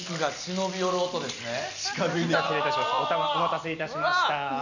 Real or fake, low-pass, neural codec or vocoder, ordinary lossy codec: real; 7.2 kHz; none; none